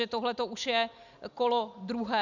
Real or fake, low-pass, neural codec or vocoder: real; 7.2 kHz; none